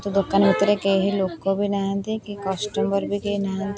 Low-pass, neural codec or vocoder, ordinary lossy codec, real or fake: none; none; none; real